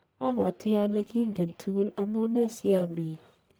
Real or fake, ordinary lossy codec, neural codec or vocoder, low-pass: fake; none; codec, 44.1 kHz, 1.7 kbps, Pupu-Codec; none